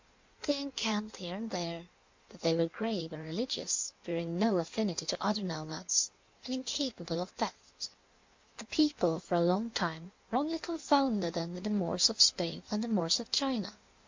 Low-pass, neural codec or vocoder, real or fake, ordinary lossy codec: 7.2 kHz; codec, 16 kHz in and 24 kHz out, 1.1 kbps, FireRedTTS-2 codec; fake; MP3, 48 kbps